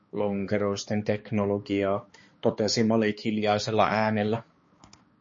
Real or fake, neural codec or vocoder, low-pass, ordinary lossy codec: fake; codec, 16 kHz, 2 kbps, X-Codec, HuBERT features, trained on balanced general audio; 7.2 kHz; MP3, 32 kbps